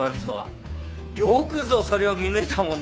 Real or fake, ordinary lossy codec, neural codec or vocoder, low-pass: fake; none; codec, 16 kHz, 2 kbps, FunCodec, trained on Chinese and English, 25 frames a second; none